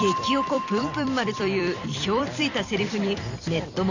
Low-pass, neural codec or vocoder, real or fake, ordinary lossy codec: 7.2 kHz; vocoder, 44.1 kHz, 128 mel bands every 256 samples, BigVGAN v2; fake; none